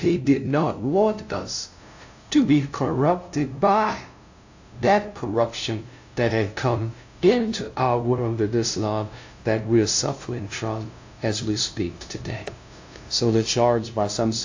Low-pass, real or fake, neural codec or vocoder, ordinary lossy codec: 7.2 kHz; fake; codec, 16 kHz, 0.5 kbps, FunCodec, trained on LibriTTS, 25 frames a second; AAC, 48 kbps